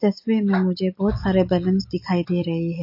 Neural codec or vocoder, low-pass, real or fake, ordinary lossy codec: none; 5.4 kHz; real; MP3, 32 kbps